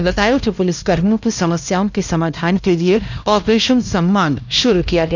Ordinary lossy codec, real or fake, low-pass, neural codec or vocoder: none; fake; 7.2 kHz; codec, 16 kHz, 1 kbps, X-Codec, HuBERT features, trained on LibriSpeech